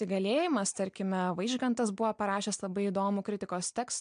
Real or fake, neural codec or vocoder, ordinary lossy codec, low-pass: real; none; MP3, 64 kbps; 9.9 kHz